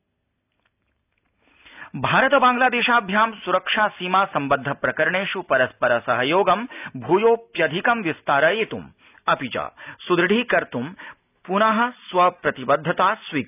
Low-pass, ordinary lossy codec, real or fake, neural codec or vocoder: 3.6 kHz; none; real; none